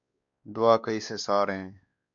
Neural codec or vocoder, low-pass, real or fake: codec, 16 kHz, 2 kbps, X-Codec, WavLM features, trained on Multilingual LibriSpeech; 7.2 kHz; fake